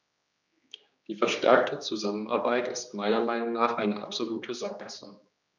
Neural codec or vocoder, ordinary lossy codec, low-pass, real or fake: codec, 16 kHz, 2 kbps, X-Codec, HuBERT features, trained on general audio; none; 7.2 kHz; fake